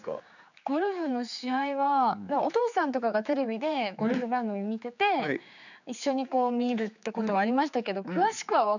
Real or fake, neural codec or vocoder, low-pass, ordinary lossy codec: fake; codec, 16 kHz, 4 kbps, X-Codec, HuBERT features, trained on general audio; 7.2 kHz; none